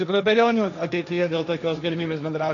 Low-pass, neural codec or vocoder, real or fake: 7.2 kHz; codec, 16 kHz, 1.1 kbps, Voila-Tokenizer; fake